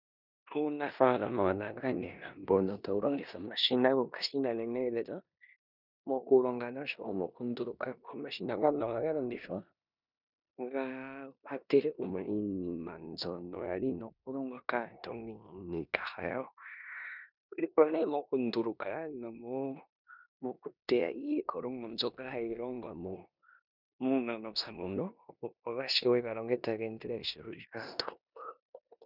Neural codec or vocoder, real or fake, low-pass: codec, 16 kHz in and 24 kHz out, 0.9 kbps, LongCat-Audio-Codec, four codebook decoder; fake; 5.4 kHz